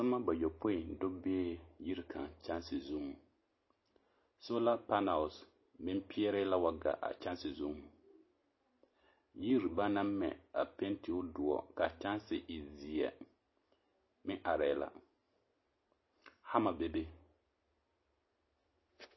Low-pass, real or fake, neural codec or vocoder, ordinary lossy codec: 7.2 kHz; real; none; MP3, 24 kbps